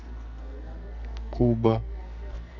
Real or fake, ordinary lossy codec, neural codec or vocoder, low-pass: fake; none; codec, 44.1 kHz, 2.6 kbps, SNAC; 7.2 kHz